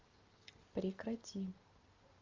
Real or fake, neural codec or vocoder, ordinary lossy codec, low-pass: real; none; Opus, 32 kbps; 7.2 kHz